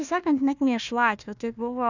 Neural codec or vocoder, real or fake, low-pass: codec, 16 kHz, 1 kbps, FunCodec, trained on Chinese and English, 50 frames a second; fake; 7.2 kHz